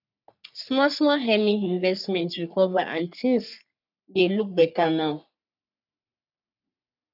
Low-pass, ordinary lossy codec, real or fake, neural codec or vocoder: 5.4 kHz; none; fake; codec, 44.1 kHz, 3.4 kbps, Pupu-Codec